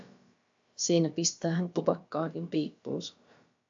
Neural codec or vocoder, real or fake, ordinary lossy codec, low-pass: codec, 16 kHz, about 1 kbps, DyCAST, with the encoder's durations; fake; AAC, 64 kbps; 7.2 kHz